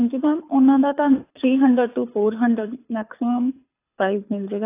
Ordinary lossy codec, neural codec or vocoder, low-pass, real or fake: AAC, 24 kbps; codec, 24 kHz, 6 kbps, HILCodec; 3.6 kHz; fake